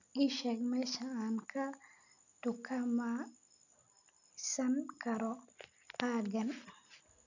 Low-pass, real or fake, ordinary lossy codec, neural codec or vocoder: 7.2 kHz; real; none; none